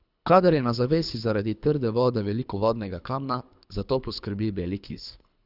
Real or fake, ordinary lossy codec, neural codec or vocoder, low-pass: fake; none; codec, 24 kHz, 3 kbps, HILCodec; 5.4 kHz